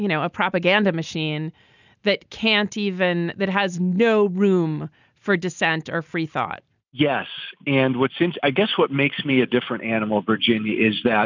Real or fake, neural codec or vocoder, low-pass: real; none; 7.2 kHz